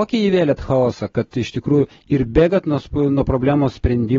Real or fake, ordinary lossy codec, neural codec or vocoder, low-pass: real; AAC, 24 kbps; none; 7.2 kHz